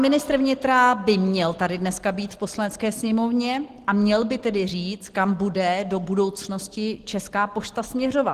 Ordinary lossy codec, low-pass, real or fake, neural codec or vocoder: Opus, 16 kbps; 14.4 kHz; real; none